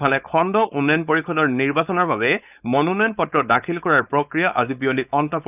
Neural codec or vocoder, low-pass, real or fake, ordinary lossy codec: codec, 16 kHz, 4.8 kbps, FACodec; 3.6 kHz; fake; none